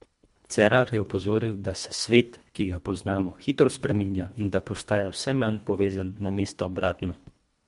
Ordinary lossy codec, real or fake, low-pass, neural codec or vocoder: MP3, 64 kbps; fake; 10.8 kHz; codec, 24 kHz, 1.5 kbps, HILCodec